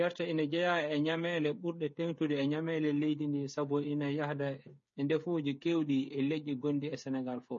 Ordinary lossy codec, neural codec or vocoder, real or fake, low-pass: MP3, 32 kbps; codec, 16 kHz, 8 kbps, FreqCodec, smaller model; fake; 7.2 kHz